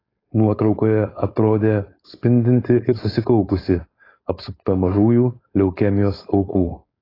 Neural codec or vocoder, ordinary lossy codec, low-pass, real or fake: codec, 16 kHz, 4.8 kbps, FACodec; AAC, 24 kbps; 5.4 kHz; fake